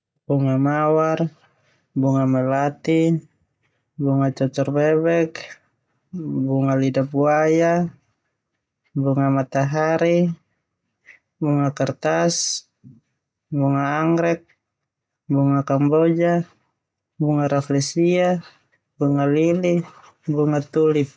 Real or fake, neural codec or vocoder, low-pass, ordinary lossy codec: real; none; none; none